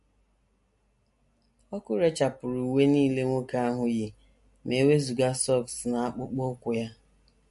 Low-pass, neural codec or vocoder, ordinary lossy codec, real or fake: 14.4 kHz; none; MP3, 48 kbps; real